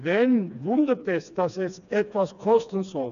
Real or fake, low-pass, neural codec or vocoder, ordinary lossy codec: fake; 7.2 kHz; codec, 16 kHz, 2 kbps, FreqCodec, smaller model; none